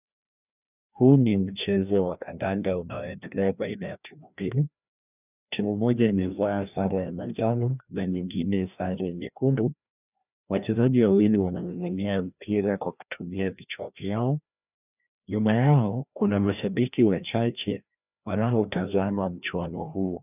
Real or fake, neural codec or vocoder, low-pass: fake; codec, 16 kHz, 1 kbps, FreqCodec, larger model; 3.6 kHz